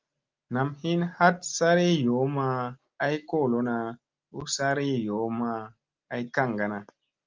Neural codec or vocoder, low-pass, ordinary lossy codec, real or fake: none; 7.2 kHz; Opus, 24 kbps; real